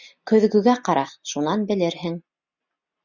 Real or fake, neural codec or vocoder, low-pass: real; none; 7.2 kHz